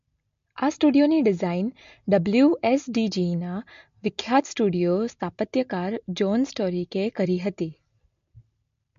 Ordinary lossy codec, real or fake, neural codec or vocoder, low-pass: MP3, 48 kbps; real; none; 7.2 kHz